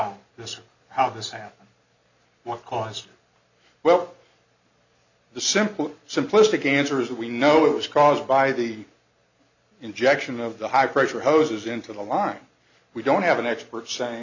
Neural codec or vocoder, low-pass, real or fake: none; 7.2 kHz; real